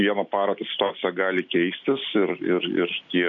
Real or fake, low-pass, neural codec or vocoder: real; 7.2 kHz; none